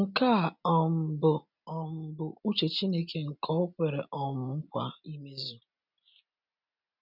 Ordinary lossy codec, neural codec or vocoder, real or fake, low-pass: none; none; real; 5.4 kHz